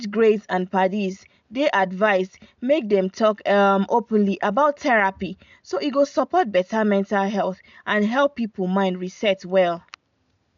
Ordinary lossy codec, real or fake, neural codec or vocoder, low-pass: MP3, 64 kbps; real; none; 7.2 kHz